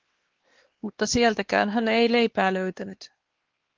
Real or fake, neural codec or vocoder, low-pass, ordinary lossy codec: fake; codec, 16 kHz, 2 kbps, X-Codec, WavLM features, trained on Multilingual LibriSpeech; 7.2 kHz; Opus, 16 kbps